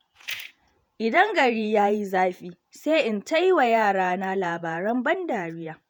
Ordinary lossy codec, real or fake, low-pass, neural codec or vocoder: none; fake; none; vocoder, 48 kHz, 128 mel bands, Vocos